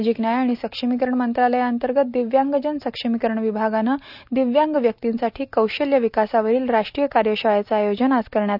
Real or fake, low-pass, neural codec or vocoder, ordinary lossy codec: real; 5.4 kHz; none; none